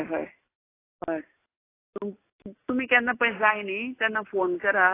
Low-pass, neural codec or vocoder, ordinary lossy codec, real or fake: 3.6 kHz; none; AAC, 24 kbps; real